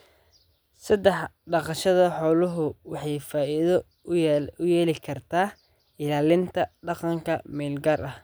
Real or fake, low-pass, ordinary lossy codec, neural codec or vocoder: real; none; none; none